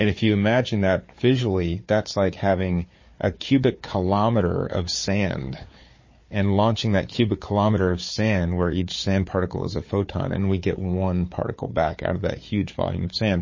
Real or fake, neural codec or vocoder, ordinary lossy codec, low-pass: fake; codec, 16 kHz, 4 kbps, FunCodec, trained on Chinese and English, 50 frames a second; MP3, 32 kbps; 7.2 kHz